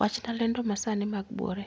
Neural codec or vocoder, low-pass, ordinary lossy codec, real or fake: none; none; none; real